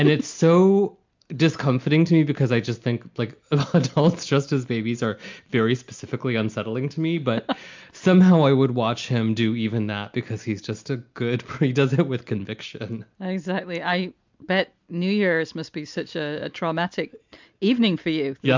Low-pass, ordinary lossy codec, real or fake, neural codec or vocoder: 7.2 kHz; MP3, 64 kbps; real; none